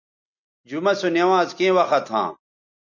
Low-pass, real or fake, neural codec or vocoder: 7.2 kHz; real; none